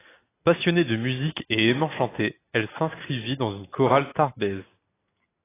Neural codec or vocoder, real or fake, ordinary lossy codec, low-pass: none; real; AAC, 16 kbps; 3.6 kHz